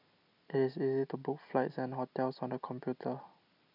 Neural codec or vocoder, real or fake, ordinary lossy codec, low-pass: none; real; none; 5.4 kHz